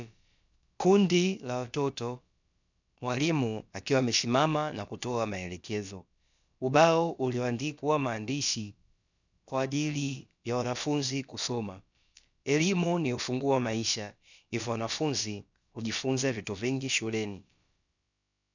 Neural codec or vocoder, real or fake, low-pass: codec, 16 kHz, about 1 kbps, DyCAST, with the encoder's durations; fake; 7.2 kHz